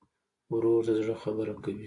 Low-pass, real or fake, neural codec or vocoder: 10.8 kHz; real; none